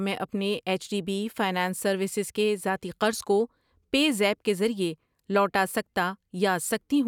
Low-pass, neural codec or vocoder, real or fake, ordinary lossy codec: 19.8 kHz; none; real; none